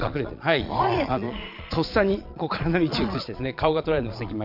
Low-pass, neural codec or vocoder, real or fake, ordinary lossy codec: 5.4 kHz; vocoder, 22.05 kHz, 80 mel bands, Vocos; fake; none